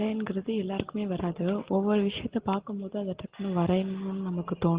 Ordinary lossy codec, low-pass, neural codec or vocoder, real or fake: Opus, 16 kbps; 3.6 kHz; none; real